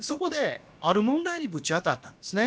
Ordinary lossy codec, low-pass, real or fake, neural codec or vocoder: none; none; fake; codec, 16 kHz, about 1 kbps, DyCAST, with the encoder's durations